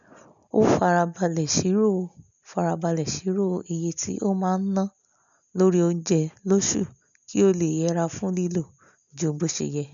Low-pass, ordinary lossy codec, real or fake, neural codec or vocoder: 7.2 kHz; none; real; none